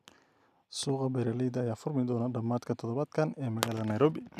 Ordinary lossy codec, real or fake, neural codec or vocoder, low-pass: none; real; none; 14.4 kHz